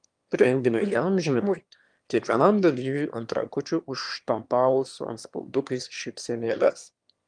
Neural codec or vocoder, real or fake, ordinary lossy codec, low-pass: autoencoder, 22.05 kHz, a latent of 192 numbers a frame, VITS, trained on one speaker; fake; Opus, 32 kbps; 9.9 kHz